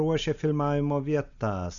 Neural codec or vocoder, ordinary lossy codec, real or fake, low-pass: none; MP3, 96 kbps; real; 7.2 kHz